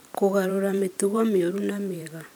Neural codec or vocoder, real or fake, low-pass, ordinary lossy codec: vocoder, 44.1 kHz, 128 mel bands every 512 samples, BigVGAN v2; fake; none; none